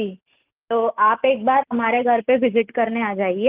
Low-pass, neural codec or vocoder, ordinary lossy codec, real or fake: 3.6 kHz; none; Opus, 32 kbps; real